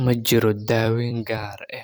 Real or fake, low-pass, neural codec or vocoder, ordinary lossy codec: fake; none; vocoder, 44.1 kHz, 128 mel bands every 256 samples, BigVGAN v2; none